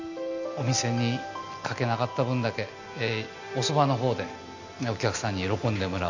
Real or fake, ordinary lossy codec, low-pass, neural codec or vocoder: real; none; 7.2 kHz; none